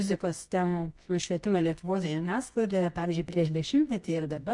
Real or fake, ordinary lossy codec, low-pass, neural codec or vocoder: fake; MP3, 64 kbps; 10.8 kHz; codec, 24 kHz, 0.9 kbps, WavTokenizer, medium music audio release